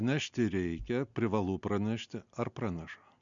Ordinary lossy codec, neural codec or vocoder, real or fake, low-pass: MP3, 64 kbps; none; real; 7.2 kHz